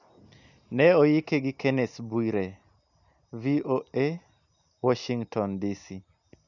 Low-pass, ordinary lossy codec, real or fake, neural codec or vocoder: 7.2 kHz; none; real; none